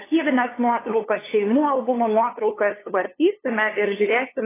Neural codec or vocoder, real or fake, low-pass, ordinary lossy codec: codec, 16 kHz, 2 kbps, FunCodec, trained on LibriTTS, 25 frames a second; fake; 3.6 kHz; AAC, 16 kbps